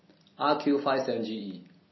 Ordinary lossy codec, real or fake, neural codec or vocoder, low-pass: MP3, 24 kbps; real; none; 7.2 kHz